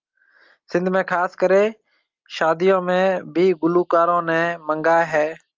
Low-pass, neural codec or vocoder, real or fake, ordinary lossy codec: 7.2 kHz; none; real; Opus, 24 kbps